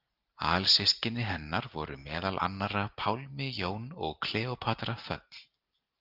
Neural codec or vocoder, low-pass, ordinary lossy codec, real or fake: none; 5.4 kHz; Opus, 24 kbps; real